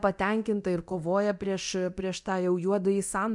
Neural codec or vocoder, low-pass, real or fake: codec, 24 kHz, 0.9 kbps, DualCodec; 10.8 kHz; fake